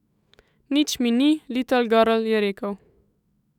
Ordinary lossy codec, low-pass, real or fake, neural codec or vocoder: none; 19.8 kHz; fake; autoencoder, 48 kHz, 128 numbers a frame, DAC-VAE, trained on Japanese speech